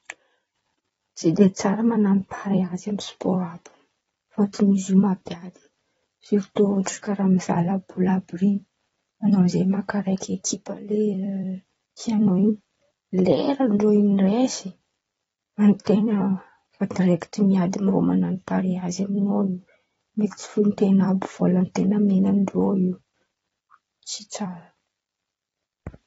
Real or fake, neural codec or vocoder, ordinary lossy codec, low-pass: fake; vocoder, 44.1 kHz, 128 mel bands every 256 samples, BigVGAN v2; AAC, 24 kbps; 19.8 kHz